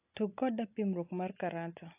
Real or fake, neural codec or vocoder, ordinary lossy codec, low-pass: real; none; AAC, 24 kbps; 3.6 kHz